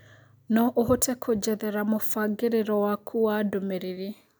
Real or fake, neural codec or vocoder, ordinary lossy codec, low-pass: real; none; none; none